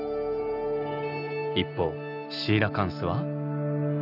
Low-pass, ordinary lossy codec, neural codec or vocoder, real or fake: 5.4 kHz; none; none; real